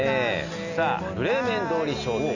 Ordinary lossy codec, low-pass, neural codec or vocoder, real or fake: none; 7.2 kHz; none; real